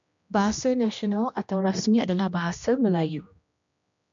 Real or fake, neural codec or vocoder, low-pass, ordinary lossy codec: fake; codec, 16 kHz, 1 kbps, X-Codec, HuBERT features, trained on general audio; 7.2 kHz; MP3, 64 kbps